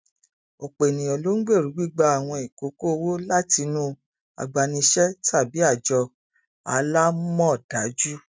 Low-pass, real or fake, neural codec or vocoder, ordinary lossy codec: none; real; none; none